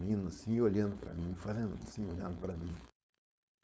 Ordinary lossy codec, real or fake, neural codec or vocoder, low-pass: none; fake; codec, 16 kHz, 4.8 kbps, FACodec; none